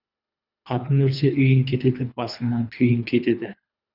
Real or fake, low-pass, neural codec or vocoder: fake; 5.4 kHz; codec, 24 kHz, 3 kbps, HILCodec